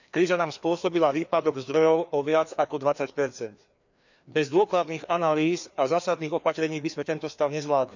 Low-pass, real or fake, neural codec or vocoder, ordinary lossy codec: 7.2 kHz; fake; codec, 16 kHz, 2 kbps, FreqCodec, larger model; none